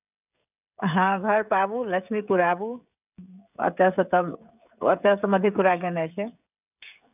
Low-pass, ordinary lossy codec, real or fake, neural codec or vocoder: 3.6 kHz; none; fake; codec, 16 kHz, 16 kbps, FreqCodec, smaller model